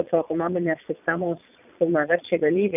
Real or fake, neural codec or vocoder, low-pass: fake; vocoder, 22.05 kHz, 80 mel bands, Vocos; 3.6 kHz